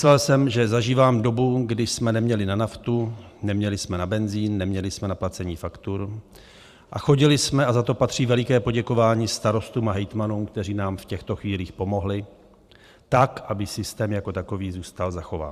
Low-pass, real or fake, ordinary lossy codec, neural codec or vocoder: 14.4 kHz; fake; Opus, 64 kbps; vocoder, 44.1 kHz, 128 mel bands every 512 samples, BigVGAN v2